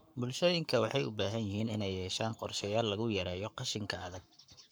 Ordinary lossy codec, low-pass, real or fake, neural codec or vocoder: none; none; fake; codec, 44.1 kHz, 7.8 kbps, Pupu-Codec